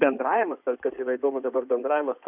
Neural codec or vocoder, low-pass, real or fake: codec, 16 kHz in and 24 kHz out, 2.2 kbps, FireRedTTS-2 codec; 3.6 kHz; fake